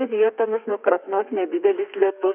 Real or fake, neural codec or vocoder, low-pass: fake; codec, 32 kHz, 1.9 kbps, SNAC; 3.6 kHz